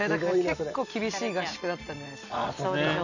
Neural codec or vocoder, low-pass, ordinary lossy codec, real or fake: none; 7.2 kHz; none; real